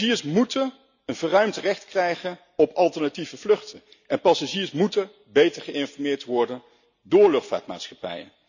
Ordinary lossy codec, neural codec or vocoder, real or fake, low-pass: none; none; real; 7.2 kHz